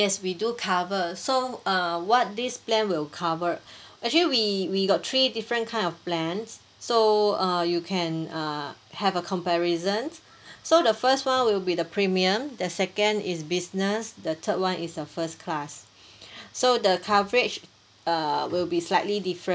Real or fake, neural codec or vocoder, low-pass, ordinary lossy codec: real; none; none; none